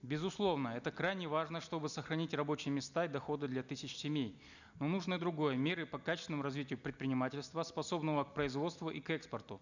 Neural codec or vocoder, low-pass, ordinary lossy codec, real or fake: none; 7.2 kHz; none; real